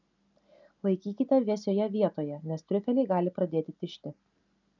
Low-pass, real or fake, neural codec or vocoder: 7.2 kHz; real; none